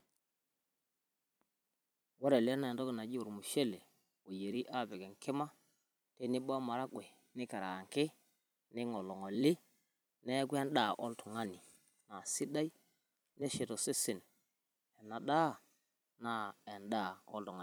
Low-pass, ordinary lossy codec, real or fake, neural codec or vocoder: none; none; fake; vocoder, 44.1 kHz, 128 mel bands every 256 samples, BigVGAN v2